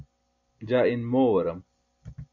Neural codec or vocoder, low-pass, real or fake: none; 7.2 kHz; real